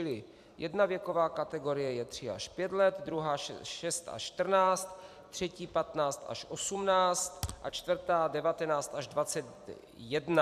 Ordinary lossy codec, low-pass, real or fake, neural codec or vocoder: AAC, 96 kbps; 14.4 kHz; fake; vocoder, 44.1 kHz, 128 mel bands every 256 samples, BigVGAN v2